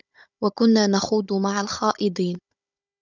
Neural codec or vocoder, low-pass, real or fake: codec, 16 kHz, 16 kbps, FunCodec, trained on Chinese and English, 50 frames a second; 7.2 kHz; fake